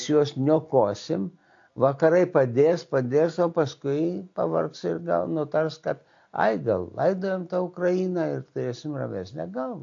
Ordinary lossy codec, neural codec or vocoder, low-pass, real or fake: AAC, 48 kbps; none; 7.2 kHz; real